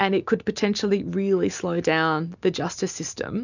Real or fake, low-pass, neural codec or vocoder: real; 7.2 kHz; none